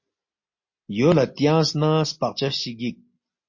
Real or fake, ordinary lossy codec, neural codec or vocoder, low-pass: real; MP3, 32 kbps; none; 7.2 kHz